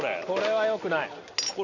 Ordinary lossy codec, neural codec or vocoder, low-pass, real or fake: none; none; 7.2 kHz; real